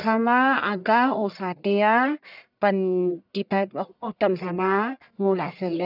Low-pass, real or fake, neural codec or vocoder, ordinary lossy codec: 5.4 kHz; fake; codec, 44.1 kHz, 1.7 kbps, Pupu-Codec; none